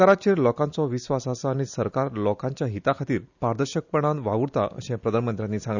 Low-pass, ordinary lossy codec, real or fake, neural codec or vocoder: 7.2 kHz; none; real; none